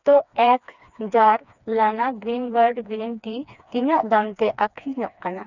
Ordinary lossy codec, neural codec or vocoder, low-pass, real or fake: none; codec, 16 kHz, 2 kbps, FreqCodec, smaller model; 7.2 kHz; fake